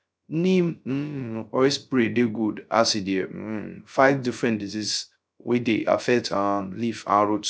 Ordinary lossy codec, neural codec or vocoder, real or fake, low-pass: none; codec, 16 kHz, 0.3 kbps, FocalCodec; fake; none